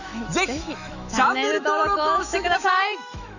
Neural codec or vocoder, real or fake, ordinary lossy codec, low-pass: autoencoder, 48 kHz, 128 numbers a frame, DAC-VAE, trained on Japanese speech; fake; none; 7.2 kHz